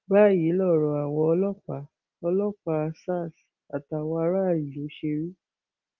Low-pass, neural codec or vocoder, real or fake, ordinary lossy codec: 7.2 kHz; none; real; Opus, 32 kbps